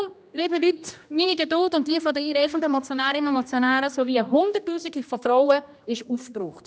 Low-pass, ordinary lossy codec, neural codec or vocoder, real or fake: none; none; codec, 16 kHz, 1 kbps, X-Codec, HuBERT features, trained on general audio; fake